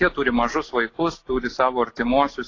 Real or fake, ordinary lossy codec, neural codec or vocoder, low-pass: real; AAC, 32 kbps; none; 7.2 kHz